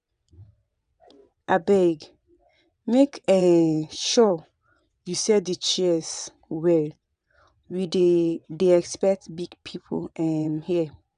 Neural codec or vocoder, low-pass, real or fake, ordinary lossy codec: vocoder, 22.05 kHz, 80 mel bands, WaveNeXt; 9.9 kHz; fake; none